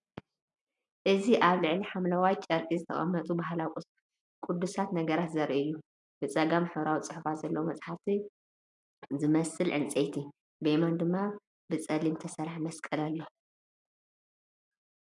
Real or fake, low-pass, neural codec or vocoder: real; 10.8 kHz; none